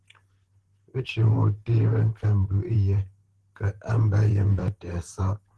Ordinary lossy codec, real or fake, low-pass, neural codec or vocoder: Opus, 16 kbps; fake; 10.8 kHz; vocoder, 44.1 kHz, 128 mel bands, Pupu-Vocoder